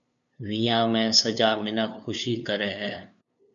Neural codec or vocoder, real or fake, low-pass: codec, 16 kHz, 2 kbps, FunCodec, trained on LibriTTS, 25 frames a second; fake; 7.2 kHz